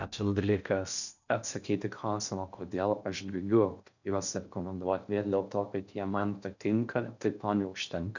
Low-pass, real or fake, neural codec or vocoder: 7.2 kHz; fake; codec, 16 kHz in and 24 kHz out, 0.6 kbps, FocalCodec, streaming, 4096 codes